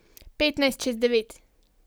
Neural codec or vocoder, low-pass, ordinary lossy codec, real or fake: none; none; none; real